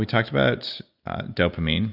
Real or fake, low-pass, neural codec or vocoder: real; 5.4 kHz; none